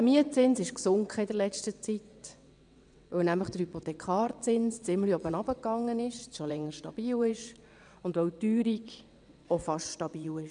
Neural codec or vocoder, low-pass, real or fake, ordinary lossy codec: vocoder, 22.05 kHz, 80 mel bands, WaveNeXt; 9.9 kHz; fake; none